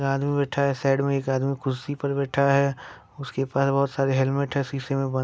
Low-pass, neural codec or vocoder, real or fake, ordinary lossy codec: none; none; real; none